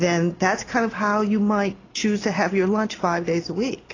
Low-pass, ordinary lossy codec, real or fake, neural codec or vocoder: 7.2 kHz; AAC, 32 kbps; real; none